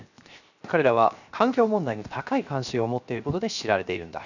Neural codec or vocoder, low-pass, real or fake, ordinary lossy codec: codec, 16 kHz, 0.7 kbps, FocalCodec; 7.2 kHz; fake; none